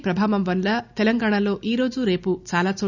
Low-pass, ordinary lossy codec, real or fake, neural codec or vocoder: 7.2 kHz; none; real; none